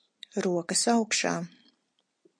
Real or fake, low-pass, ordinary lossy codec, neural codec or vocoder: real; 9.9 kHz; MP3, 48 kbps; none